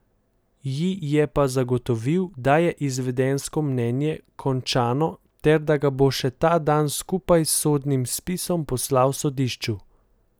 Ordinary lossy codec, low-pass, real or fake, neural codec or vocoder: none; none; real; none